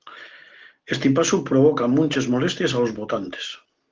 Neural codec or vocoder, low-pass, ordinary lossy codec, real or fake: none; 7.2 kHz; Opus, 16 kbps; real